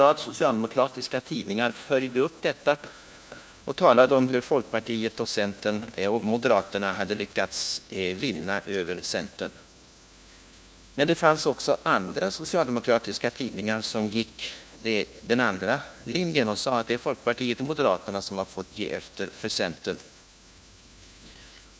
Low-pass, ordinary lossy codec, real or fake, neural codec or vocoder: none; none; fake; codec, 16 kHz, 1 kbps, FunCodec, trained on LibriTTS, 50 frames a second